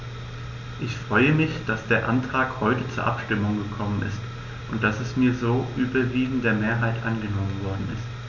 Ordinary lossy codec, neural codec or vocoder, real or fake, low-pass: none; none; real; 7.2 kHz